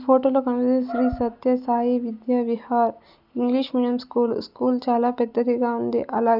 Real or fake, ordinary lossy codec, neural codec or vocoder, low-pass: real; none; none; 5.4 kHz